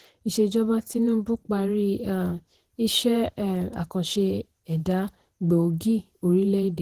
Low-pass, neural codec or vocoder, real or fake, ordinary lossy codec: 14.4 kHz; vocoder, 48 kHz, 128 mel bands, Vocos; fake; Opus, 16 kbps